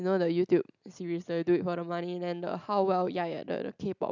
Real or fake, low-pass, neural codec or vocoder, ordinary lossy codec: real; 7.2 kHz; none; none